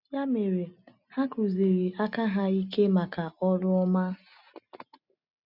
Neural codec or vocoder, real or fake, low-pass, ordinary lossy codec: none; real; 5.4 kHz; none